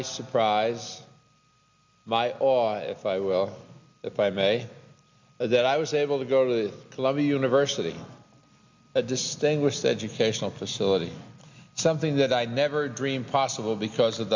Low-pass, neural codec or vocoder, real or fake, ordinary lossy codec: 7.2 kHz; none; real; MP3, 64 kbps